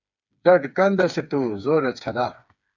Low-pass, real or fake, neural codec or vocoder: 7.2 kHz; fake; codec, 16 kHz, 4 kbps, FreqCodec, smaller model